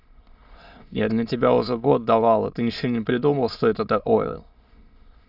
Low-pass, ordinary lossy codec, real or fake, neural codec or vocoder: 5.4 kHz; Opus, 64 kbps; fake; autoencoder, 22.05 kHz, a latent of 192 numbers a frame, VITS, trained on many speakers